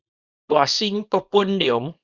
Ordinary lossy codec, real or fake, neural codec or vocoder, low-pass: Opus, 64 kbps; fake; codec, 24 kHz, 0.9 kbps, WavTokenizer, small release; 7.2 kHz